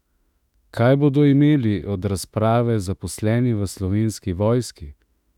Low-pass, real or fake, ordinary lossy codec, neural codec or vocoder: 19.8 kHz; fake; none; autoencoder, 48 kHz, 32 numbers a frame, DAC-VAE, trained on Japanese speech